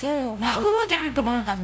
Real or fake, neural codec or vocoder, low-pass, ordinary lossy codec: fake; codec, 16 kHz, 0.5 kbps, FunCodec, trained on LibriTTS, 25 frames a second; none; none